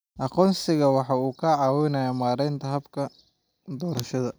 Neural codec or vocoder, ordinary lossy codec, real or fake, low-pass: none; none; real; none